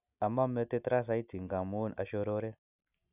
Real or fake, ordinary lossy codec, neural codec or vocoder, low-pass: real; none; none; 3.6 kHz